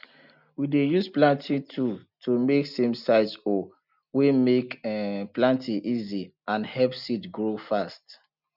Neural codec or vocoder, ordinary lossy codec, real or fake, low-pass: none; none; real; 5.4 kHz